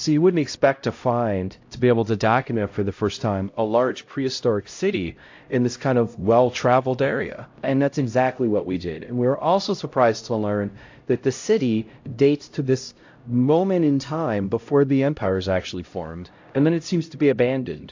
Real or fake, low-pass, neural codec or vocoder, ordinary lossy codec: fake; 7.2 kHz; codec, 16 kHz, 0.5 kbps, X-Codec, HuBERT features, trained on LibriSpeech; AAC, 48 kbps